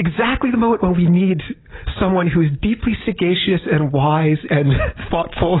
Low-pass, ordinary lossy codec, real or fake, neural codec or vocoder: 7.2 kHz; AAC, 16 kbps; real; none